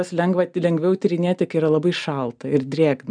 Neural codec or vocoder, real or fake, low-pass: none; real; 9.9 kHz